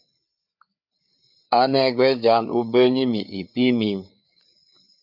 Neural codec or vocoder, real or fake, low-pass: codec, 16 kHz, 4 kbps, FreqCodec, larger model; fake; 5.4 kHz